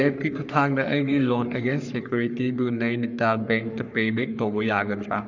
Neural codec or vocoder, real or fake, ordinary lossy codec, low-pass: codec, 44.1 kHz, 3.4 kbps, Pupu-Codec; fake; none; 7.2 kHz